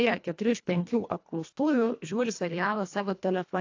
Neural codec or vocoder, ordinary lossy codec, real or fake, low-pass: codec, 24 kHz, 1.5 kbps, HILCodec; AAC, 48 kbps; fake; 7.2 kHz